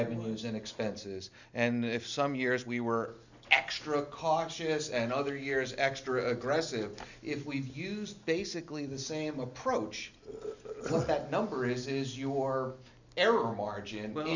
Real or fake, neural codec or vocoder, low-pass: real; none; 7.2 kHz